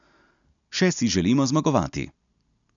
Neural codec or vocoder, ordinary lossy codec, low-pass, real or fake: none; MP3, 96 kbps; 7.2 kHz; real